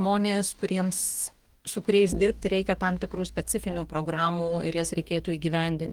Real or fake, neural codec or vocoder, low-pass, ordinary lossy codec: fake; codec, 44.1 kHz, 2.6 kbps, DAC; 19.8 kHz; Opus, 32 kbps